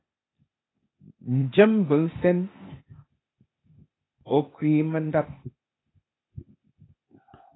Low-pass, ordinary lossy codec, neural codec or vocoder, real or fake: 7.2 kHz; AAC, 16 kbps; codec, 16 kHz, 0.8 kbps, ZipCodec; fake